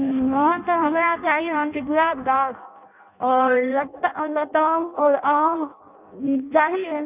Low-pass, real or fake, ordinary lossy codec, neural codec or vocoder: 3.6 kHz; fake; none; codec, 16 kHz in and 24 kHz out, 0.6 kbps, FireRedTTS-2 codec